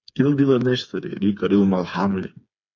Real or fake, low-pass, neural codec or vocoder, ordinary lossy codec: fake; 7.2 kHz; codec, 16 kHz, 4 kbps, FreqCodec, smaller model; AAC, 48 kbps